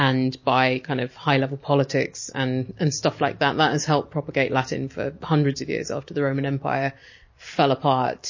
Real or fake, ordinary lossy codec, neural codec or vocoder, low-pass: real; MP3, 32 kbps; none; 7.2 kHz